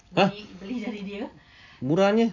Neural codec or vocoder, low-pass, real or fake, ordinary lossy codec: none; 7.2 kHz; real; none